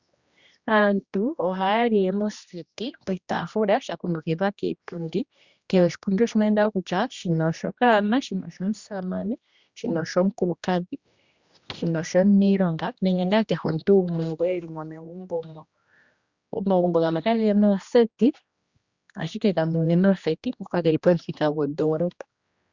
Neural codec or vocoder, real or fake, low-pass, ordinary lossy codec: codec, 16 kHz, 1 kbps, X-Codec, HuBERT features, trained on general audio; fake; 7.2 kHz; Opus, 64 kbps